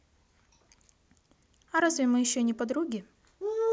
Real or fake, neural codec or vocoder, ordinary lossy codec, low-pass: real; none; none; none